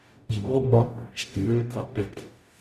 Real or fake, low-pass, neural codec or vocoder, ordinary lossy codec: fake; 14.4 kHz; codec, 44.1 kHz, 0.9 kbps, DAC; none